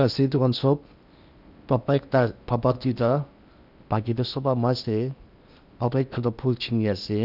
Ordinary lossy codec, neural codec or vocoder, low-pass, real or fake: none; codec, 16 kHz in and 24 kHz out, 0.8 kbps, FocalCodec, streaming, 65536 codes; 5.4 kHz; fake